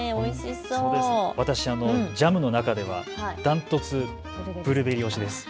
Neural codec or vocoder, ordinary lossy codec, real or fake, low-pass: none; none; real; none